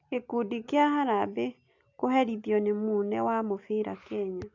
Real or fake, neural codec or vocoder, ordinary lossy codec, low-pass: real; none; AAC, 48 kbps; 7.2 kHz